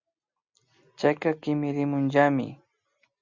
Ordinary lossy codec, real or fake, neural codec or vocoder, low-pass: Opus, 64 kbps; real; none; 7.2 kHz